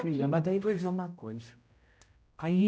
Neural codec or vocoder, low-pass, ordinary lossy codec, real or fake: codec, 16 kHz, 0.5 kbps, X-Codec, HuBERT features, trained on general audio; none; none; fake